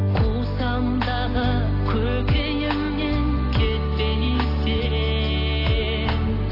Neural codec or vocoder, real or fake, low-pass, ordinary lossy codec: none; real; 5.4 kHz; AAC, 24 kbps